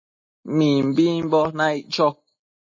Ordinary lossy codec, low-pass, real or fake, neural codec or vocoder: MP3, 32 kbps; 7.2 kHz; real; none